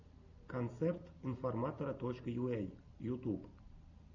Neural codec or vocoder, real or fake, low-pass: none; real; 7.2 kHz